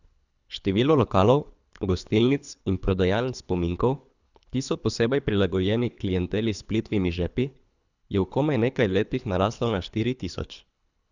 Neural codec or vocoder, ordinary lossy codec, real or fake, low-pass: codec, 24 kHz, 3 kbps, HILCodec; none; fake; 7.2 kHz